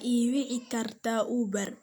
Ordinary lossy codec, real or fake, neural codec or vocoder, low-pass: none; real; none; none